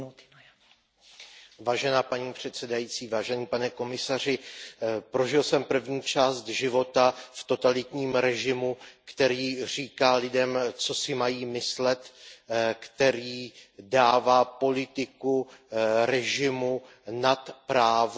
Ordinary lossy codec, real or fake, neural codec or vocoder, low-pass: none; real; none; none